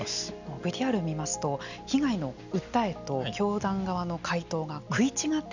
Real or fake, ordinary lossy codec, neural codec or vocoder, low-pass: real; none; none; 7.2 kHz